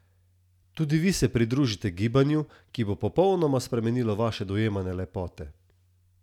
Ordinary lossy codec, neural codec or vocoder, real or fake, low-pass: none; none; real; 19.8 kHz